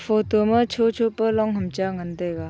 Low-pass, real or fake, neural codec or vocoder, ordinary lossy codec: none; real; none; none